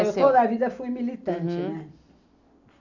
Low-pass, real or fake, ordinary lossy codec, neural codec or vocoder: 7.2 kHz; real; none; none